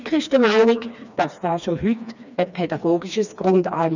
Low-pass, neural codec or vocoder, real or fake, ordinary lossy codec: 7.2 kHz; codec, 16 kHz, 2 kbps, FreqCodec, smaller model; fake; none